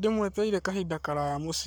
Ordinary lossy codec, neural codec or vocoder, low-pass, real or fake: none; codec, 44.1 kHz, 7.8 kbps, Pupu-Codec; none; fake